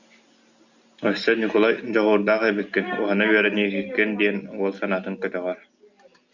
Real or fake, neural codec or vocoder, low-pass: real; none; 7.2 kHz